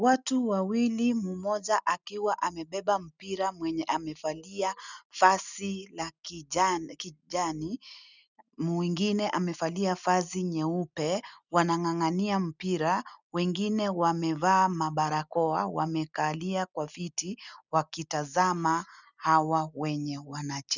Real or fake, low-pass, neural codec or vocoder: real; 7.2 kHz; none